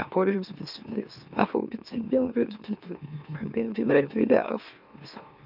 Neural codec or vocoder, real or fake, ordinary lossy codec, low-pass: autoencoder, 44.1 kHz, a latent of 192 numbers a frame, MeloTTS; fake; none; 5.4 kHz